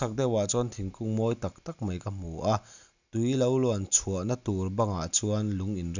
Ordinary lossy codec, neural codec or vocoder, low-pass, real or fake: none; none; 7.2 kHz; real